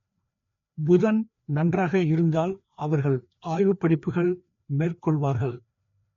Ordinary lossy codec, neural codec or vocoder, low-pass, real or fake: MP3, 48 kbps; codec, 16 kHz, 2 kbps, FreqCodec, larger model; 7.2 kHz; fake